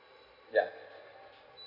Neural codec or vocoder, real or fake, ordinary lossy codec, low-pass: none; real; AAC, 32 kbps; 5.4 kHz